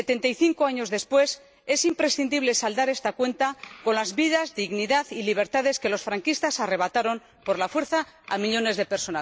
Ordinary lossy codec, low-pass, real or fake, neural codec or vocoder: none; none; real; none